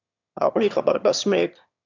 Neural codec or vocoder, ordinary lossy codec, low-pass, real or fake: autoencoder, 22.05 kHz, a latent of 192 numbers a frame, VITS, trained on one speaker; MP3, 64 kbps; 7.2 kHz; fake